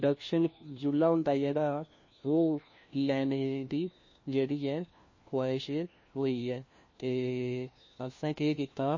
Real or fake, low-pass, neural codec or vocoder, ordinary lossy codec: fake; 7.2 kHz; codec, 16 kHz, 1 kbps, FunCodec, trained on LibriTTS, 50 frames a second; MP3, 32 kbps